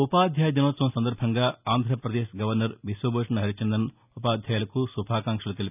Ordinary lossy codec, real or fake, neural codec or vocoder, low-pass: none; real; none; 3.6 kHz